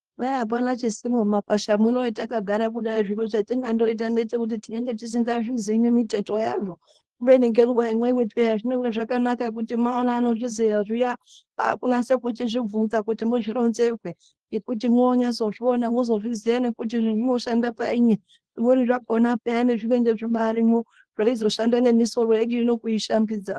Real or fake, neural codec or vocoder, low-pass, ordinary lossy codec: fake; codec, 24 kHz, 0.9 kbps, WavTokenizer, small release; 10.8 kHz; Opus, 16 kbps